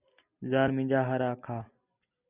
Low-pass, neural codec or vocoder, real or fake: 3.6 kHz; none; real